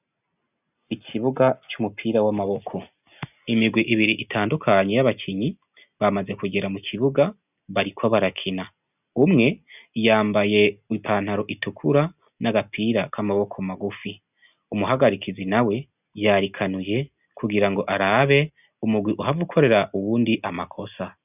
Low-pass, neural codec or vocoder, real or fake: 3.6 kHz; none; real